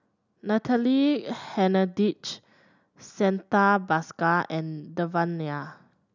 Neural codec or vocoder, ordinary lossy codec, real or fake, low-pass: none; none; real; 7.2 kHz